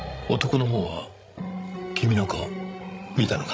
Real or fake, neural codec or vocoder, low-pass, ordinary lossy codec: fake; codec, 16 kHz, 16 kbps, FreqCodec, larger model; none; none